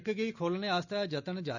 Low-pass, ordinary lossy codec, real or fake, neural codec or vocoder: 7.2 kHz; none; real; none